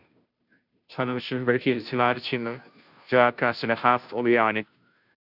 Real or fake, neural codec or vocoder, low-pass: fake; codec, 16 kHz, 0.5 kbps, FunCodec, trained on Chinese and English, 25 frames a second; 5.4 kHz